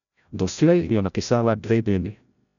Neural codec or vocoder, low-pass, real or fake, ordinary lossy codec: codec, 16 kHz, 0.5 kbps, FreqCodec, larger model; 7.2 kHz; fake; none